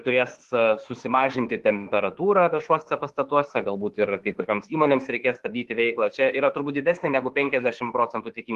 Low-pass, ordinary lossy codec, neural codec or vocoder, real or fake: 14.4 kHz; Opus, 16 kbps; autoencoder, 48 kHz, 32 numbers a frame, DAC-VAE, trained on Japanese speech; fake